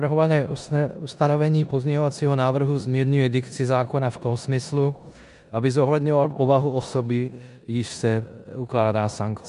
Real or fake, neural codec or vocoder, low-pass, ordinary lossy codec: fake; codec, 16 kHz in and 24 kHz out, 0.9 kbps, LongCat-Audio-Codec, four codebook decoder; 10.8 kHz; MP3, 96 kbps